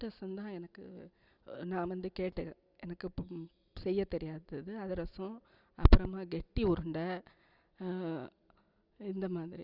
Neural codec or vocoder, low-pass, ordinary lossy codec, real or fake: none; 5.4 kHz; Opus, 64 kbps; real